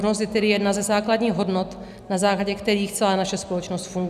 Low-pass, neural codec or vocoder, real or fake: 14.4 kHz; none; real